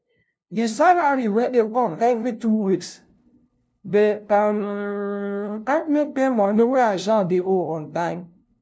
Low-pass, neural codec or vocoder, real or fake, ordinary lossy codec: none; codec, 16 kHz, 0.5 kbps, FunCodec, trained on LibriTTS, 25 frames a second; fake; none